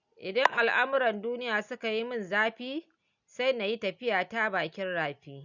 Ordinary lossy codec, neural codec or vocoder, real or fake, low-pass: none; none; real; 7.2 kHz